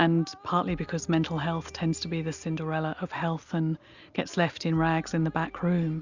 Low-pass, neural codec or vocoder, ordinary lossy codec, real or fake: 7.2 kHz; none; Opus, 64 kbps; real